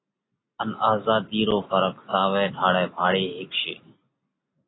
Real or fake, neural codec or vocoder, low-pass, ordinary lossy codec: real; none; 7.2 kHz; AAC, 16 kbps